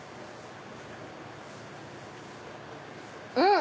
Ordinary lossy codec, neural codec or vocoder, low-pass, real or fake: none; none; none; real